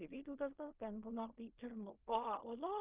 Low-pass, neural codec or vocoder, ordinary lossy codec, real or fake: 3.6 kHz; codec, 16 kHz in and 24 kHz out, 0.4 kbps, LongCat-Audio-Codec, fine tuned four codebook decoder; Opus, 24 kbps; fake